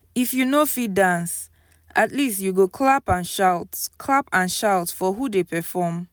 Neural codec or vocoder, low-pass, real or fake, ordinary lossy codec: none; none; real; none